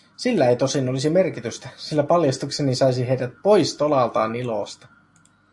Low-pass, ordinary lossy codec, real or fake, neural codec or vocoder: 10.8 kHz; AAC, 64 kbps; real; none